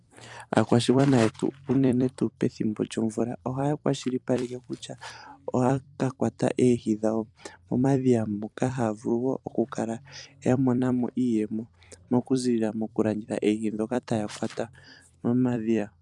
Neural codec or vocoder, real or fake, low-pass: vocoder, 44.1 kHz, 128 mel bands every 256 samples, BigVGAN v2; fake; 10.8 kHz